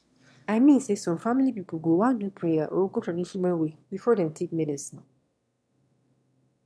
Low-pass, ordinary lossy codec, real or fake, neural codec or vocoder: none; none; fake; autoencoder, 22.05 kHz, a latent of 192 numbers a frame, VITS, trained on one speaker